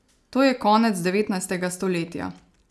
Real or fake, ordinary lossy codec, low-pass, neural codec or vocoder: real; none; none; none